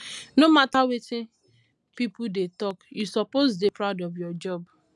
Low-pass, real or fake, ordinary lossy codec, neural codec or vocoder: none; real; none; none